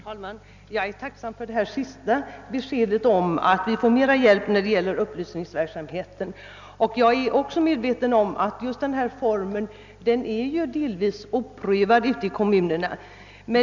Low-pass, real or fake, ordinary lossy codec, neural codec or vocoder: 7.2 kHz; real; none; none